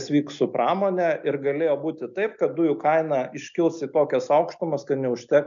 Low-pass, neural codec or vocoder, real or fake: 7.2 kHz; none; real